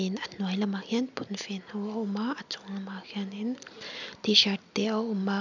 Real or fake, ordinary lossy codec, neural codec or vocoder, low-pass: real; none; none; 7.2 kHz